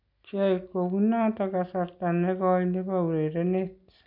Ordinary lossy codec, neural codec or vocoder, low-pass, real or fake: none; none; 5.4 kHz; real